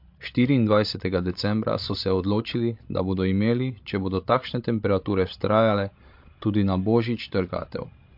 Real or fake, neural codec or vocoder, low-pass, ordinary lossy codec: fake; codec, 16 kHz, 16 kbps, FreqCodec, larger model; 5.4 kHz; MP3, 48 kbps